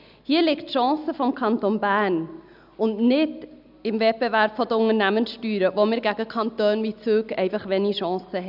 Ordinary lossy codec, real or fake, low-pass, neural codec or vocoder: none; real; 5.4 kHz; none